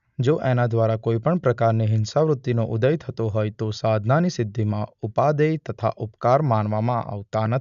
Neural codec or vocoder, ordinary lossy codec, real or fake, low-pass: none; none; real; 7.2 kHz